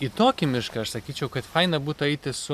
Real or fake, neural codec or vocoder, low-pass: real; none; 14.4 kHz